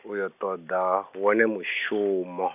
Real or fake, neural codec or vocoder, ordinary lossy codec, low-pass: real; none; none; 3.6 kHz